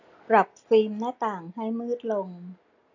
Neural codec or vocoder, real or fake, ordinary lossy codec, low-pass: none; real; AAC, 48 kbps; 7.2 kHz